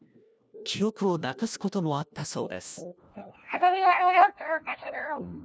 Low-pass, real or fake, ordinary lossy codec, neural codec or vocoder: none; fake; none; codec, 16 kHz, 1 kbps, FunCodec, trained on LibriTTS, 50 frames a second